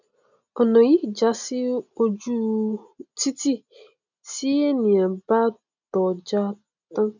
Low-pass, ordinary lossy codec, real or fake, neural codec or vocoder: 7.2 kHz; none; real; none